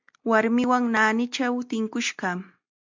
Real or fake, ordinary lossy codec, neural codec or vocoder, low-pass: real; MP3, 64 kbps; none; 7.2 kHz